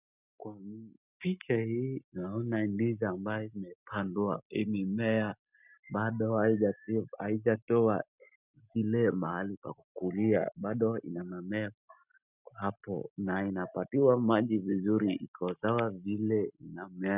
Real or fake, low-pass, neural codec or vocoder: real; 3.6 kHz; none